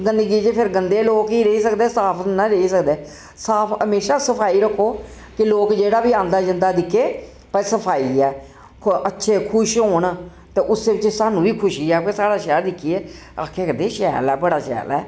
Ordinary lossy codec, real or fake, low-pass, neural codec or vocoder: none; real; none; none